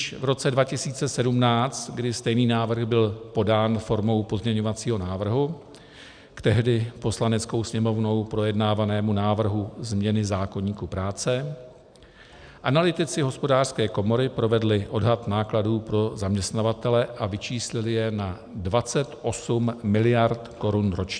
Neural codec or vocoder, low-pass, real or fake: none; 9.9 kHz; real